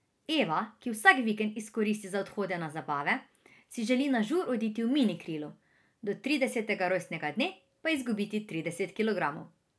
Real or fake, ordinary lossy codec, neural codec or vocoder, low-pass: real; none; none; none